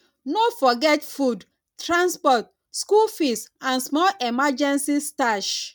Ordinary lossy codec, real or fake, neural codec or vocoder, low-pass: none; real; none; 19.8 kHz